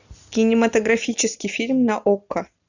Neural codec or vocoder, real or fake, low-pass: none; real; 7.2 kHz